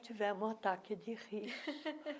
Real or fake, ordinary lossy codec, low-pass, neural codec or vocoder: real; none; none; none